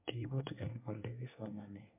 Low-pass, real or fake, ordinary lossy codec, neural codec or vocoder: 3.6 kHz; fake; MP3, 32 kbps; codec, 24 kHz, 1 kbps, SNAC